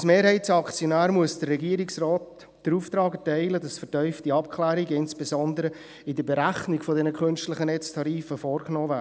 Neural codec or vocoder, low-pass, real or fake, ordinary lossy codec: none; none; real; none